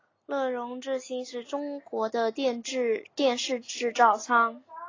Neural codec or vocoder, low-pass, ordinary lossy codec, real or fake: none; 7.2 kHz; AAC, 32 kbps; real